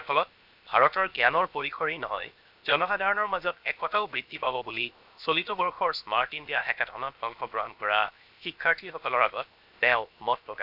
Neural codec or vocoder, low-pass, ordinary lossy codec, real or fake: codec, 16 kHz, 0.7 kbps, FocalCodec; 5.4 kHz; none; fake